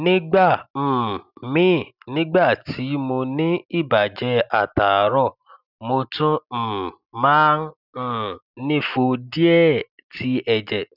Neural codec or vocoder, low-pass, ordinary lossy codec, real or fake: none; 5.4 kHz; none; real